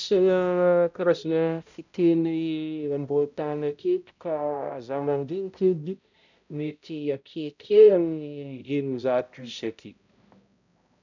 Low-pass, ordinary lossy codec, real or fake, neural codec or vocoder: 7.2 kHz; none; fake; codec, 16 kHz, 0.5 kbps, X-Codec, HuBERT features, trained on balanced general audio